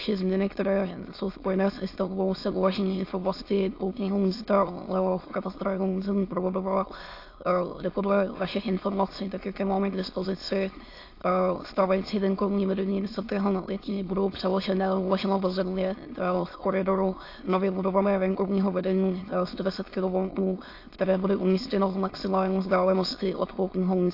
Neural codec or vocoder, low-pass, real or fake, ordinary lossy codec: autoencoder, 22.05 kHz, a latent of 192 numbers a frame, VITS, trained on many speakers; 5.4 kHz; fake; AAC, 32 kbps